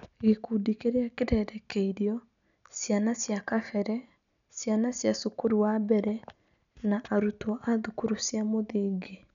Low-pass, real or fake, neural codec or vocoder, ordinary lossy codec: 7.2 kHz; real; none; none